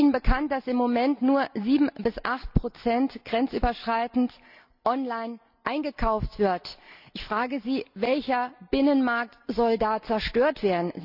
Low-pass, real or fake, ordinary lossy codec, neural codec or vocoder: 5.4 kHz; real; none; none